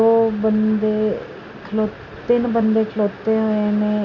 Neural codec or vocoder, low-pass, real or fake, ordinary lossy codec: none; 7.2 kHz; real; none